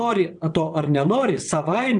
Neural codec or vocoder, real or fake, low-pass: vocoder, 22.05 kHz, 80 mel bands, WaveNeXt; fake; 9.9 kHz